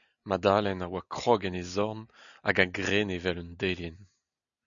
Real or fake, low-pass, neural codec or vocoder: real; 7.2 kHz; none